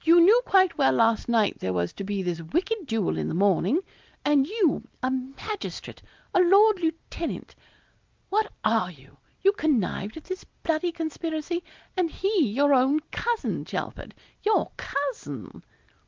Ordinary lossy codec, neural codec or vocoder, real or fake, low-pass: Opus, 32 kbps; none; real; 7.2 kHz